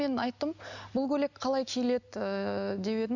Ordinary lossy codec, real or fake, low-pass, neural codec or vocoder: none; real; 7.2 kHz; none